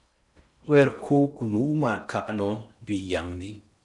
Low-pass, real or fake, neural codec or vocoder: 10.8 kHz; fake; codec, 16 kHz in and 24 kHz out, 0.6 kbps, FocalCodec, streaming, 4096 codes